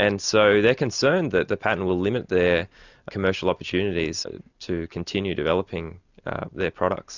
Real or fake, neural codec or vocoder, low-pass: real; none; 7.2 kHz